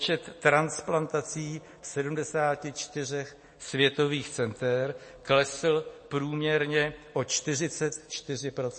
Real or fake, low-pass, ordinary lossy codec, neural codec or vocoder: real; 9.9 kHz; MP3, 32 kbps; none